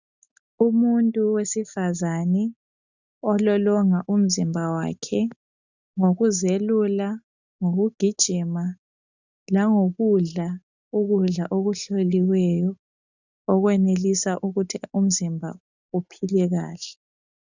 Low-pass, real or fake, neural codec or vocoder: 7.2 kHz; real; none